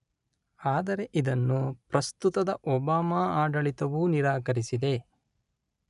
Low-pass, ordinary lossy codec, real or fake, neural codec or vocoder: 10.8 kHz; none; real; none